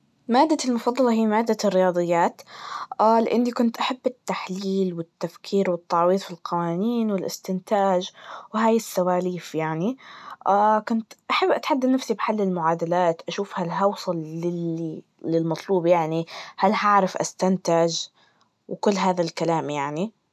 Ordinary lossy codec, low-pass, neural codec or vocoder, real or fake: none; none; none; real